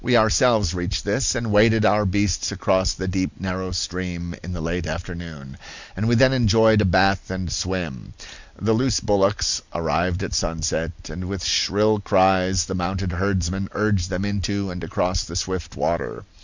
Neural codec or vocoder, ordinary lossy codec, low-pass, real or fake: none; Opus, 64 kbps; 7.2 kHz; real